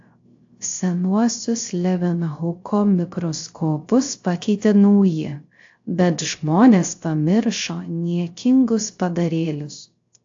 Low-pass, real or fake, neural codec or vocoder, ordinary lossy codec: 7.2 kHz; fake; codec, 16 kHz, 0.3 kbps, FocalCodec; AAC, 48 kbps